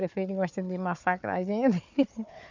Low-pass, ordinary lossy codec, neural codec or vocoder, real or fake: 7.2 kHz; none; codec, 16 kHz, 4 kbps, FunCodec, trained on Chinese and English, 50 frames a second; fake